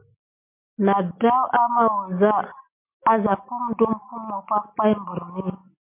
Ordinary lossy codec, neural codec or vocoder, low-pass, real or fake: MP3, 24 kbps; none; 3.6 kHz; real